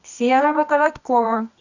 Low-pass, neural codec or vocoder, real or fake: 7.2 kHz; codec, 24 kHz, 0.9 kbps, WavTokenizer, medium music audio release; fake